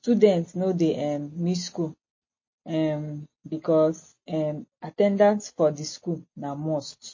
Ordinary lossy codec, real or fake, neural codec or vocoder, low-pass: MP3, 32 kbps; real; none; 7.2 kHz